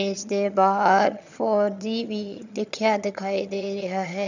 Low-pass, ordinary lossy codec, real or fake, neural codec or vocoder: 7.2 kHz; none; fake; vocoder, 22.05 kHz, 80 mel bands, HiFi-GAN